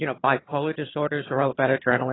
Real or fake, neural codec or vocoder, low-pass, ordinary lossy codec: fake; vocoder, 22.05 kHz, 80 mel bands, HiFi-GAN; 7.2 kHz; AAC, 16 kbps